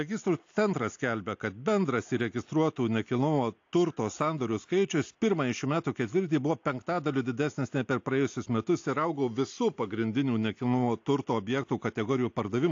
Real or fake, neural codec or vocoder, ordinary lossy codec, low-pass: real; none; AAC, 48 kbps; 7.2 kHz